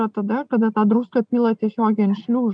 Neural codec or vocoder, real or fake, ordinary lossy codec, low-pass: none; real; MP3, 96 kbps; 9.9 kHz